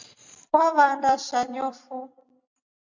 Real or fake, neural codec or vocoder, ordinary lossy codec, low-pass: fake; vocoder, 44.1 kHz, 128 mel bands every 256 samples, BigVGAN v2; MP3, 64 kbps; 7.2 kHz